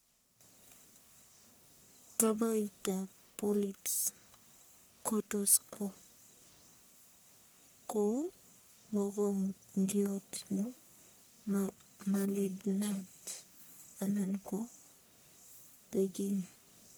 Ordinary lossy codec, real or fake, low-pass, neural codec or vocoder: none; fake; none; codec, 44.1 kHz, 1.7 kbps, Pupu-Codec